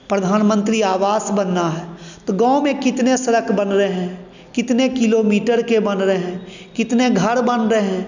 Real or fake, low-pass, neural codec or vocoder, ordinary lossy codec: real; 7.2 kHz; none; none